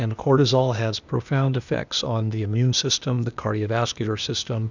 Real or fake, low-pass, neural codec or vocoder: fake; 7.2 kHz; codec, 16 kHz, 0.8 kbps, ZipCodec